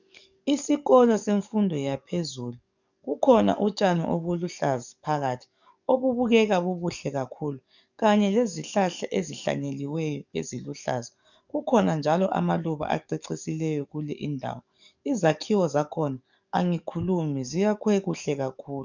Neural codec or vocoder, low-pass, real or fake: codec, 44.1 kHz, 7.8 kbps, DAC; 7.2 kHz; fake